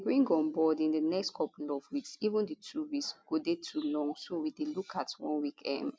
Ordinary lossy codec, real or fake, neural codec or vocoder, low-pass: none; real; none; none